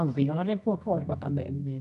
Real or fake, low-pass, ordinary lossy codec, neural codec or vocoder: fake; 10.8 kHz; none; codec, 24 kHz, 0.9 kbps, WavTokenizer, medium music audio release